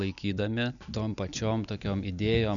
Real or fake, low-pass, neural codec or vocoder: real; 7.2 kHz; none